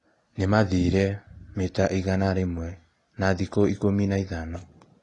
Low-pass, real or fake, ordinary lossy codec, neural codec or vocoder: 10.8 kHz; real; AAC, 32 kbps; none